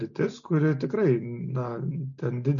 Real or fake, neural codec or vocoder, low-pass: real; none; 7.2 kHz